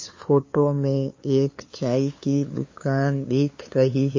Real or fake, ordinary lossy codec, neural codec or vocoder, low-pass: fake; MP3, 32 kbps; codec, 16 kHz, 2 kbps, FunCodec, trained on LibriTTS, 25 frames a second; 7.2 kHz